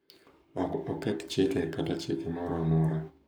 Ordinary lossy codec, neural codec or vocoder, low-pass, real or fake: none; codec, 44.1 kHz, 7.8 kbps, Pupu-Codec; none; fake